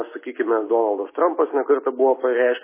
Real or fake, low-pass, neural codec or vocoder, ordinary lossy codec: real; 3.6 kHz; none; MP3, 16 kbps